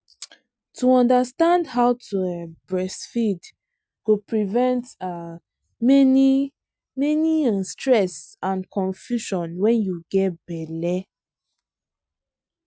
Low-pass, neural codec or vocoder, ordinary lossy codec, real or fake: none; none; none; real